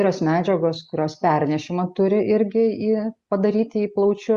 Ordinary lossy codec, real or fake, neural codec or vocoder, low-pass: Opus, 64 kbps; real; none; 10.8 kHz